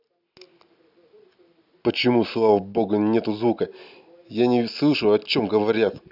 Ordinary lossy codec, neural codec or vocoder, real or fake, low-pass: none; none; real; 5.4 kHz